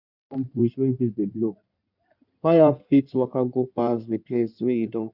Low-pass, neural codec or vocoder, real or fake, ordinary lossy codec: 5.4 kHz; codec, 16 kHz in and 24 kHz out, 2.2 kbps, FireRedTTS-2 codec; fake; none